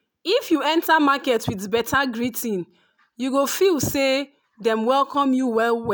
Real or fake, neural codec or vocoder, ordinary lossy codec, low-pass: real; none; none; none